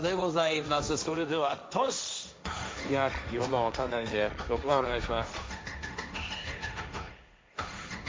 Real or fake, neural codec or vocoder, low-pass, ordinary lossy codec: fake; codec, 16 kHz, 1.1 kbps, Voila-Tokenizer; none; none